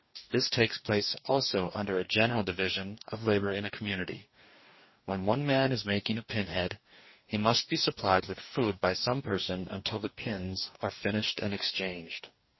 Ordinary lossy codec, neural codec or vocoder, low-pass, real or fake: MP3, 24 kbps; codec, 44.1 kHz, 2.6 kbps, DAC; 7.2 kHz; fake